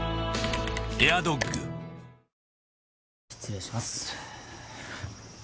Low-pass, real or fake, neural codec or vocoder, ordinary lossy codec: none; real; none; none